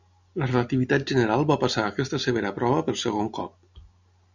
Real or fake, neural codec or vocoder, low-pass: real; none; 7.2 kHz